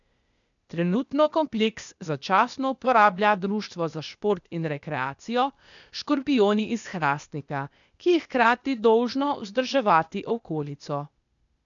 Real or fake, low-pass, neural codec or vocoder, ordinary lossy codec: fake; 7.2 kHz; codec, 16 kHz, 0.8 kbps, ZipCodec; none